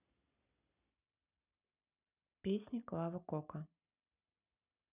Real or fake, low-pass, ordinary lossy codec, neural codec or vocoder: real; 3.6 kHz; none; none